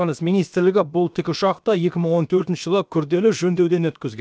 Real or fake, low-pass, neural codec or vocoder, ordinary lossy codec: fake; none; codec, 16 kHz, about 1 kbps, DyCAST, with the encoder's durations; none